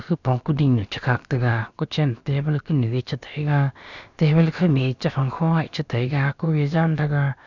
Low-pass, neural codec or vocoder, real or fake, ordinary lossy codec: 7.2 kHz; codec, 16 kHz, about 1 kbps, DyCAST, with the encoder's durations; fake; none